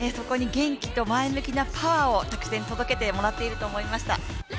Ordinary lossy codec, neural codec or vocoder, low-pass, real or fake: none; none; none; real